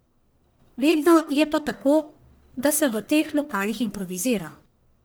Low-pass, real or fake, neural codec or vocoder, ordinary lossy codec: none; fake; codec, 44.1 kHz, 1.7 kbps, Pupu-Codec; none